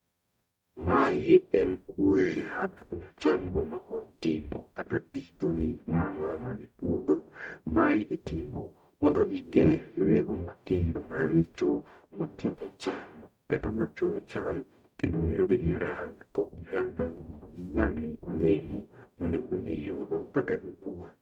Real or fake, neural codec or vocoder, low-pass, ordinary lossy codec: fake; codec, 44.1 kHz, 0.9 kbps, DAC; 19.8 kHz; MP3, 96 kbps